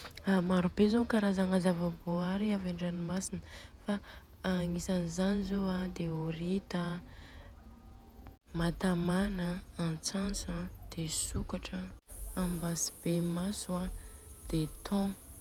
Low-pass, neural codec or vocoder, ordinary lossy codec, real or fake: 19.8 kHz; vocoder, 48 kHz, 128 mel bands, Vocos; none; fake